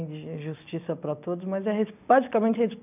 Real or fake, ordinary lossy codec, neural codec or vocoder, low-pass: real; none; none; 3.6 kHz